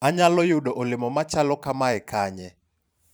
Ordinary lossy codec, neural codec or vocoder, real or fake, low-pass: none; vocoder, 44.1 kHz, 128 mel bands every 512 samples, BigVGAN v2; fake; none